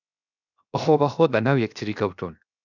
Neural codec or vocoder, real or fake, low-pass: codec, 16 kHz, 0.7 kbps, FocalCodec; fake; 7.2 kHz